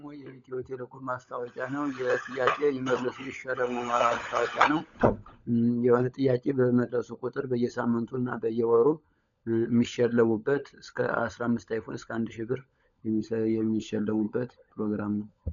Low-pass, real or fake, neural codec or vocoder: 7.2 kHz; fake; codec, 16 kHz, 16 kbps, FunCodec, trained on LibriTTS, 50 frames a second